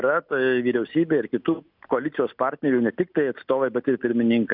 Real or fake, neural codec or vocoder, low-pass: real; none; 5.4 kHz